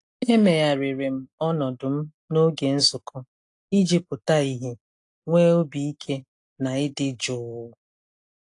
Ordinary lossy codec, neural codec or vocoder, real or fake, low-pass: AAC, 48 kbps; none; real; 10.8 kHz